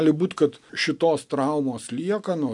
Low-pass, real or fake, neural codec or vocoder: 10.8 kHz; real; none